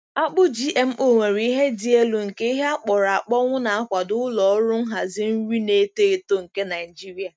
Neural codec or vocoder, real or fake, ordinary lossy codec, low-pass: none; real; none; none